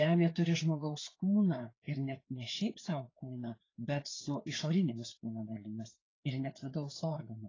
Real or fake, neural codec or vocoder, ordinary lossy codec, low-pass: fake; codec, 16 kHz, 4 kbps, FreqCodec, larger model; AAC, 32 kbps; 7.2 kHz